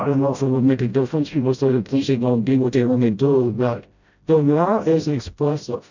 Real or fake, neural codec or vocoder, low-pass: fake; codec, 16 kHz, 0.5 kbps, FreqCodec, smaller model; 7.2 kHz